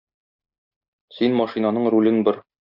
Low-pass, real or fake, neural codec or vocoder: 5.4 kHz; real; none